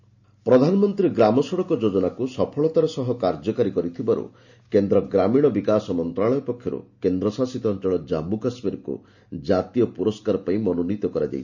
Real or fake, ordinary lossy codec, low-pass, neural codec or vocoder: real; none; 7.2 kHz; none